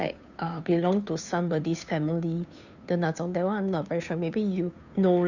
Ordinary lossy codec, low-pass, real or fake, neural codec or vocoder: none; 7.2 kHz; fake; codec, 16 kHz, 2 kbps, FunCodec, trained on Chinese and English, 25 frames a second